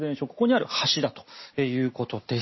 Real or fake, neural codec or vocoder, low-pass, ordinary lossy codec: real; none; 7.2 kHz; MP3, 24 kbps